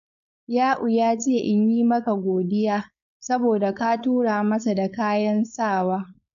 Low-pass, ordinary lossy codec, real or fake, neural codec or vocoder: 7.2 kHz; none; fake; codec, 16 kHz, 4.8 kbps, FACodec